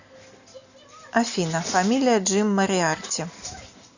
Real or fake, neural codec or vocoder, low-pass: real; none; 7.2 kHz